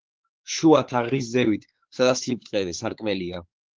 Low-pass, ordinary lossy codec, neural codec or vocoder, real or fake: 7.2 kHz; Opus, 16 kbps; codec, 16 kHz, 4 kbps, X-Codec, WavLM features, trained on Multilingual LibriSpeech; fake